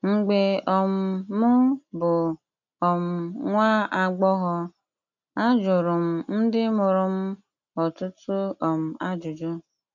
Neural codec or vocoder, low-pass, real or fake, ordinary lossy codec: none; 7.2 kHz; real; none